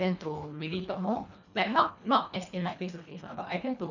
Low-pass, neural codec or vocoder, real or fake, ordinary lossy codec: 7.2 kHz; codec, 24 kHz, 1.5 kbps, HILCodec; fake; none